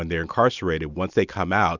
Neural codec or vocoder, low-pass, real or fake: none; 7.2 kHz; real